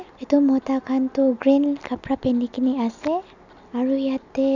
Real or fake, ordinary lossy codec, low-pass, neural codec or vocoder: real; none; 7.2 kHz; none